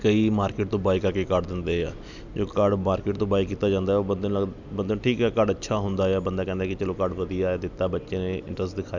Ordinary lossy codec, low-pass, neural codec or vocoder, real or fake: none; 7.2 kHz; none; real